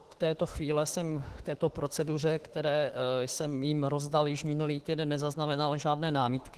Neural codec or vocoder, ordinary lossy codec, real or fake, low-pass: autoencoder, 48 kHz, 32 numbers a frame, DAC-VAE, trained on Japanese speech; Opus, 16 kbps; fake; 14.4 kHz